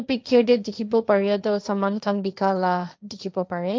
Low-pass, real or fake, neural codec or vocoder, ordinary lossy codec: none; fake; codec, 16 kHz, 1.1 kbps, Voila-Tokenizer; none